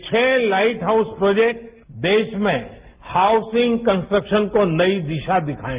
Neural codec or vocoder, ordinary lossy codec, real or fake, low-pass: none; Opus, 32 kbps; real; 3.6 kHz